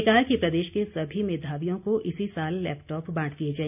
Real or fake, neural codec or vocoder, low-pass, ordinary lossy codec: real; none; 3.6 kHz; none